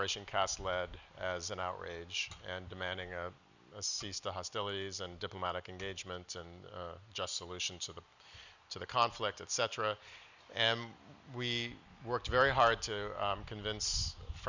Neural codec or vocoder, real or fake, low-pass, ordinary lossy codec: none; real; 7.2 kHz; Opus, 64 kbps